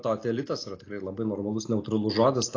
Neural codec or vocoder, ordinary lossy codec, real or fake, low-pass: none; AAC, 32 kbps; real; 7.2 kHz